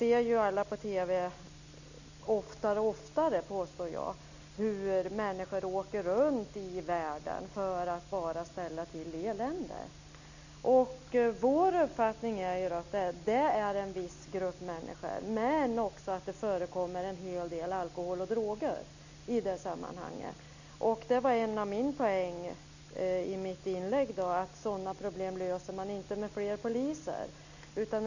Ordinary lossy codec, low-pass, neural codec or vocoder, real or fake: none; 7.2 kHz; none; real